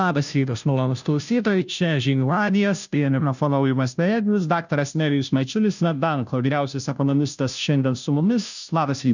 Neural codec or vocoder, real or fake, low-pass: codec, 16 kHz, 0.5 kbps, FunCodec, trained on Chinese and English, 25 frames a second; fake; 7.2 kHz